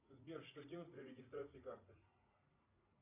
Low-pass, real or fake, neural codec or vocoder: 3.6 kHz; fake; vocoder, 44.1 kHz, 80 mel bands, Vocos